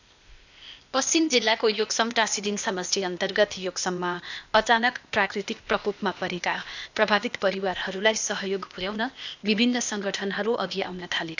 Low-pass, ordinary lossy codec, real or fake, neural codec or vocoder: 7.2 kHz; none; fake; codec, 16 kHz, 0.8 kbps, ZipCodec